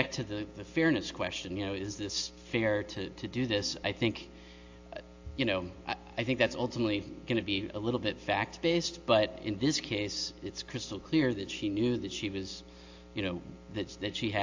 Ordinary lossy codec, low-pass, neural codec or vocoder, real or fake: AAC, 48 kbps; 7.2 kHz; none; real